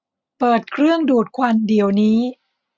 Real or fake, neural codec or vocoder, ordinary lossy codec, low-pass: real; none; none; none